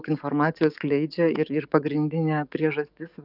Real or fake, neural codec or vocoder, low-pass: fake; codec, 44.1 kHz, 7.8 kbps, DAC; 5.4 kHz